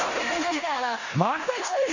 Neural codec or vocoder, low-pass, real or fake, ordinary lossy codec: codec, 16 kHz in and 24 kHz out, 0.9 kbps, LongCat-Audio-Codec, four codebook decoder; 7.2 kHz; fake; none